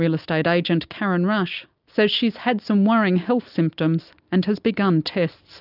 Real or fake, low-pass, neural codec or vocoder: real; 5.4 kHz; none